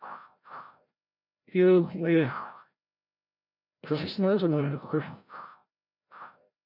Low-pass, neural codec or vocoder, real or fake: 5.4 kHz; codec, 16 kHz, 0.5 kbps, FreqCodec, larger model; fake